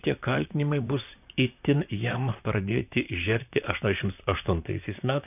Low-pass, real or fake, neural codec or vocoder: 3.6 kHz; fake; vocoder, 44.1 kHz, 128 mel bands, Pupu-Vocoder